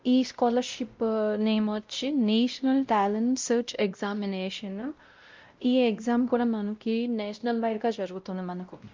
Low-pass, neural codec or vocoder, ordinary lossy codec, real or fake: 7.2 kHz; codec, 16 kHz, 0.5 kbps, X-Codec, WavLM features, trained on Multilingual LibriSpeech; Opus, 24 kbps; fake